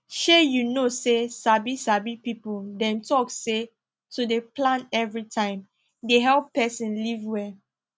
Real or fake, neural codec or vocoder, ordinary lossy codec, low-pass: real; none; none; none